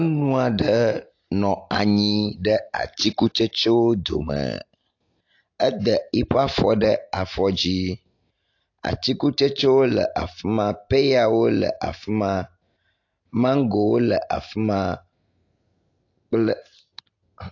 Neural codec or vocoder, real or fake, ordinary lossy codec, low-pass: none; real; AAC, 48 kbps; 7.2 kHz